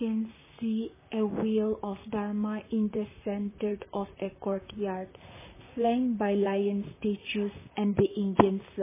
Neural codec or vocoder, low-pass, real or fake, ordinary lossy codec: vocoder, 44.1 kHz, 128 mel bands, Pupu-Vocoder; 3.6 kHz; fake; MP3, 16 kbps